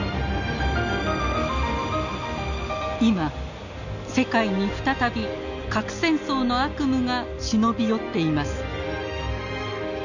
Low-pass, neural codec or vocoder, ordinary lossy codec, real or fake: 7.2 kHz; none; none; real